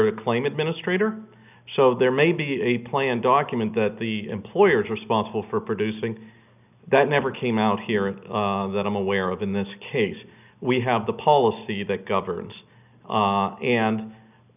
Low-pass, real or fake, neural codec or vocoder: 3.6 kHz; real; none